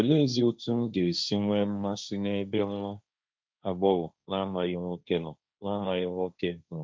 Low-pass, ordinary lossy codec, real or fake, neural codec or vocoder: none; none; fake; codec, 16 kHz, 1.1 kbps, Voila-Tokenizer